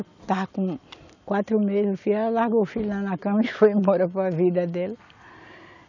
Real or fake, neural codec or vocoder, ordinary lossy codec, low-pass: real; none; none; 7.2 kHz